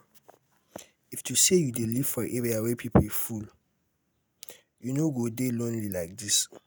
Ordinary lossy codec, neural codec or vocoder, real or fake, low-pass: none; none; real; none